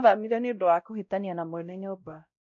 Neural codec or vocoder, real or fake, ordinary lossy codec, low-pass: codec, 16 kHz, 0.5 kbps, X-Codec, WavLM features, trained on Multilingual LibriSpeech; fake; none; 7.2 kHz